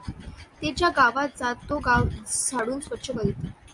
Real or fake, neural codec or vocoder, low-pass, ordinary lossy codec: real; none; 10.8 kHz; MP3, 64 kbps